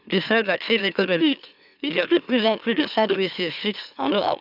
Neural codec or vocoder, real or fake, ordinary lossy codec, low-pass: autoencoder, 44.1 kHz, a latent of 192 numbers a frame, MeloTTS; fake; none; 5.4 kHz